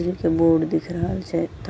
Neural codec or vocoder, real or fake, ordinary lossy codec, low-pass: none; real; none; none